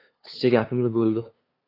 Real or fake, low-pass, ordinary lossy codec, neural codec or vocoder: fake; 5.4 kHz; AAC, 32 kbps; codec, 16 kHz, 2 kbps, FunCodec, trained on LibriTTS, 25 frames a second